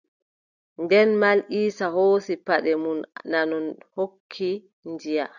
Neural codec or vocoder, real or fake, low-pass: none; real; 7.2 kHz